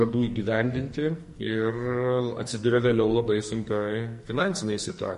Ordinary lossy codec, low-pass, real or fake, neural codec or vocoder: MP3, 48 kbps; 14.4 kHz; fake; codec, 44.1 kHz, 2.6 kbps, SNAC